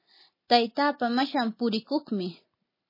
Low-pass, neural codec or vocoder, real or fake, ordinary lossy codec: 5.4 kHz; none; real; MP3, 24 kbps